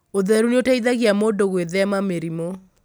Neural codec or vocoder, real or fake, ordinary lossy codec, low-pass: none; real; none; none